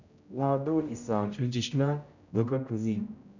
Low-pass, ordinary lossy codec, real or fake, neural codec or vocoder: 7.2 kHz; none; fake; codec, 16 kHz, 0.5 kbps, X-Codec, HuBERT features, trained on general audio